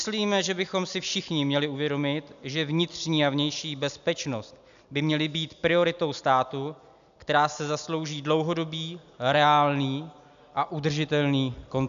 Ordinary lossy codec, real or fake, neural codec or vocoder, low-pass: MP3, 96 kbps; real; none; 7.2 kHz